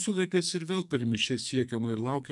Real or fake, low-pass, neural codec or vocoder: fake; 10.8 kHz; codec, 44.1 kHz, 2.6 kbps, SNAC